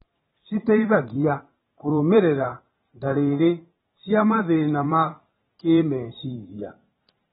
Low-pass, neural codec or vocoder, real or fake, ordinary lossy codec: 19.8 kHz; vocoder, 44.1 kHz, 128 mel bands every 512 samples, BigVGAN v2; fake; AAC, 16 kbps